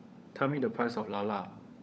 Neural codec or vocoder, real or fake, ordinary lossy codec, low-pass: codec, 16 kHz, 16 kbps, FunCodec, trained on LibriTTS, 50 frames a second; fake; none; none